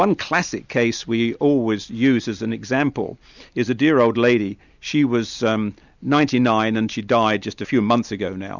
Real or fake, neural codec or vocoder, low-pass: real; none; 7.2 kHz